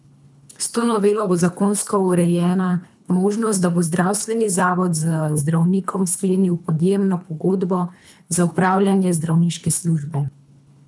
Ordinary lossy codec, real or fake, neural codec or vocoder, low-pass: none; fake; codec, 24 kHz, 3 kbps, HILCodec; none